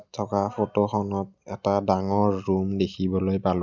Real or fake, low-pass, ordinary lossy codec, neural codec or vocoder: real; 7.2 kHz; none; none